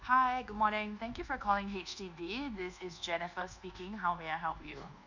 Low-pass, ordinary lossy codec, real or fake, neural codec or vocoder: 7.2 kHz; none; fake; codec, 24 kHz, 1.2 kbps, DualCodec